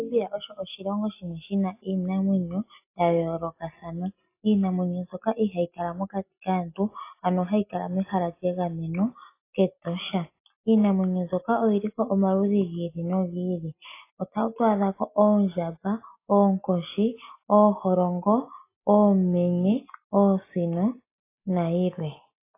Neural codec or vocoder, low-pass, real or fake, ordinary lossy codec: none; 3.6 kHz; real; AAC, 24 kbps